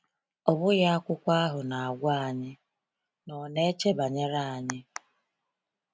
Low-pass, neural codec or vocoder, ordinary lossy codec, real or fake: none; none; none; real